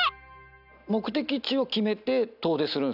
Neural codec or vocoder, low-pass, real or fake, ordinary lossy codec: none; 5.4 kHz; real; none